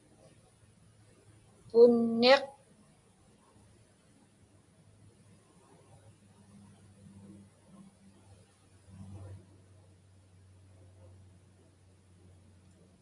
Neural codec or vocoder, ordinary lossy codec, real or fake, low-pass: none; AAC, 64 kbps; real; 10.8 kHz